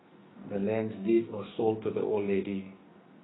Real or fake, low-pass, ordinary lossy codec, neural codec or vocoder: fake; 7.2 kHz; AAC, 16 kbps; codec, 44.1 kHz, 2.6 kbps, SNAC